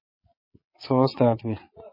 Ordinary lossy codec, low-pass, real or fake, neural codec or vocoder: MP3, 24 kbps; 5.4 kHz; fake; vocoder, 22.05 kHz, 80 mel bands, Vocos